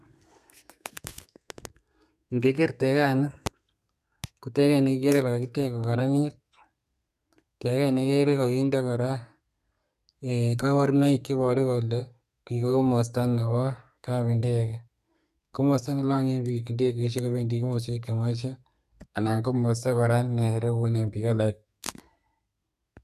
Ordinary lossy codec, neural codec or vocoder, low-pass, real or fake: none; codec, 32 kHz, 1.9 kbps, SNAC; 14.4 kHz; fake